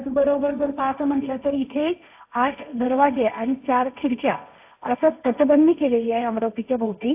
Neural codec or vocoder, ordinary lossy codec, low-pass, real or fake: codec, 16 kHz, 1.1 kbps, Voila-Tokenizer; AAC, 32 kbps; 3.6 kHz; fake